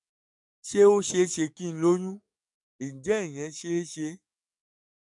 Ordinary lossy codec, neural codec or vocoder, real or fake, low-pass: none; codec, 44.1 kHz, 7.8 kbps, DAC; fake; 10.8 kHz